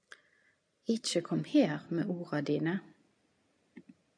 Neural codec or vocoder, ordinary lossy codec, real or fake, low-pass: none; AAC, 64 kbps; real; 9.9 kHz